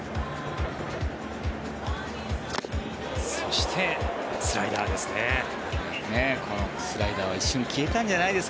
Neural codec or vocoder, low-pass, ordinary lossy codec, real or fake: none; none; none; real